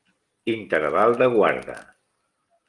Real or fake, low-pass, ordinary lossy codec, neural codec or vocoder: real; 10.8 kHz; Opus, 32 kbps; none